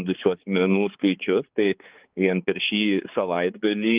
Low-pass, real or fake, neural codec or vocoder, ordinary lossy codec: 3.6 kHz; fake; codec, 16 kHz, 4 kbps, X-Codec, HuBERT features, trained on balanced general audio; Opus, 32 kbps